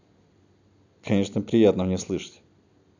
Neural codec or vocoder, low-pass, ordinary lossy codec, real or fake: none; 7.2 kHz; none; real